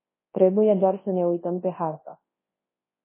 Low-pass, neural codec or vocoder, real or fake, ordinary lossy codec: 3.6 kHz; codec, 24 kHz, 0.9 kbps, WavTokenizer, large speech release; fake; MP3, 16 kbps